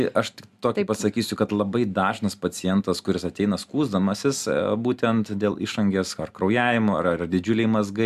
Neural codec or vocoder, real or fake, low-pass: none; real; 14.4 kHz